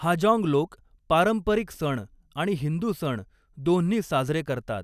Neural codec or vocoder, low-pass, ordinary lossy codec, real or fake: none; 14.4 kHz; none; real